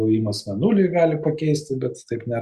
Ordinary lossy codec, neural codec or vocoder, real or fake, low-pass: Opus, 64 kbps; none; real; 14.4 kHz